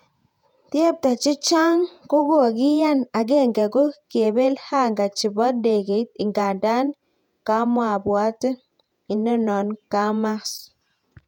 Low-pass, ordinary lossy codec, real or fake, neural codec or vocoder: 19.8 kHz; none; fake; vocoder, 48 kHz, 128 mel bands, Vocos